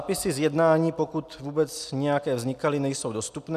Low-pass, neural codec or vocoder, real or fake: 14.4 kHz; none; real